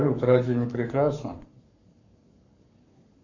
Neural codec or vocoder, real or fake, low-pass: codec, 44.1 kHz, 7.8 kbps, Pupu-Codec; fake; 7.2 kHz